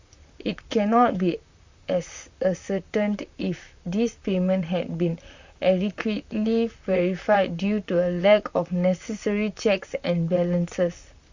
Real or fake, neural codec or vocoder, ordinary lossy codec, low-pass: fake; vocoder, 44.1 kHz, 128 mel bands, Pupu-Vocoder; Opus, 64 kbps; 7.2 kHz